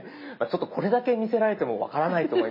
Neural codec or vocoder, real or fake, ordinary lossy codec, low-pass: none; real; MP3, 24 kbps; 5.4 kHz